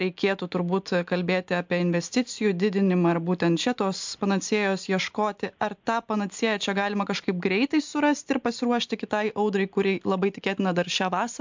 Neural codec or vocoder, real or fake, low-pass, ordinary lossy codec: none; real; 7.2 kHz; MP3, 64 kbps